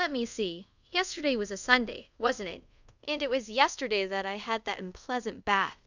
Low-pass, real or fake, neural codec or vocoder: 7.2 kHz; fake; codec, 24 kHz, 0.5 kbps, DualCodec